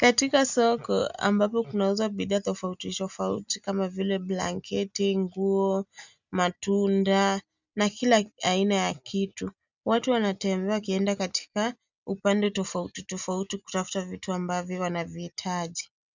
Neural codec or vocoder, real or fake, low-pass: none; real; 7.2 kHz